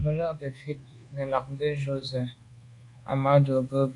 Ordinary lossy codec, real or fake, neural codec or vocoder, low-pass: AAC, 48 kbps; fake; codec, 24 kHz, 1.2 kbps, DualCodec; 10.8 kHz